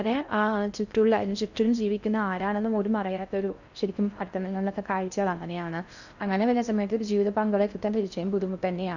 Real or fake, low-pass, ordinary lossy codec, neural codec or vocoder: fake; 7.2 kHz; none; codec, 16 kHz in and 24 kHz out, 0.6 kbps, FocalCodec, streaming, 4096 codes